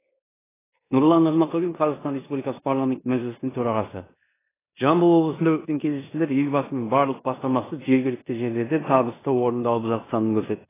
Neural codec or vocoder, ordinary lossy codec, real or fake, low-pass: codec, 16 kHz in and 24 kHz out, 0.9 kbps, LongCat-Audio-Codec, four codebook decoder; AAC, 16 kbps; fake; 3.6 kHz